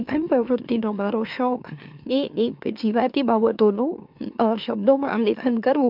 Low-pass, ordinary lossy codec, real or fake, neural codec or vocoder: 5.4 kHz; MP3, 48 kbps; fake; autoencoder, 44.1 kHz, a latent of 192 numbers a frame, MeloTTS